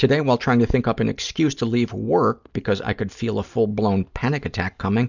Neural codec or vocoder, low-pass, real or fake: none; 7.2 kHz; real